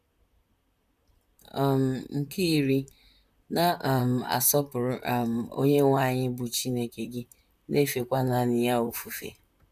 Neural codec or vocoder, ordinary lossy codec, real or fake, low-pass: vocoder, 44.1 kHz, 128 mel bands, Pupu-Vocoder; none; fake; 14.4 kHz